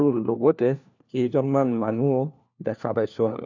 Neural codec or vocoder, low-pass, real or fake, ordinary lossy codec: codec, 16 kHz, 1 kbps, FunCodec, trained on LibriTTS, 50 frames a second; 7.2 kHz; fake; none